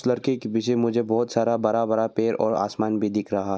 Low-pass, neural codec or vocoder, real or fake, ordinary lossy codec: none; none; real; none